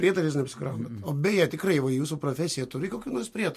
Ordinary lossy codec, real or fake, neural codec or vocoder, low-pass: MP3, 64 kbps; real; none; 14.4 kHz